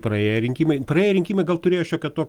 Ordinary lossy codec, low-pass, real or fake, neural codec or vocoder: Opus, 24 kbps; 19.8 kHz; real; none